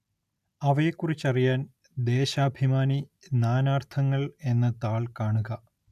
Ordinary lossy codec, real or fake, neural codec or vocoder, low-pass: none; real; none; 14.4 kHz